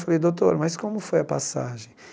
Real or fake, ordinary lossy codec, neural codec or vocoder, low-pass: real; none; none; none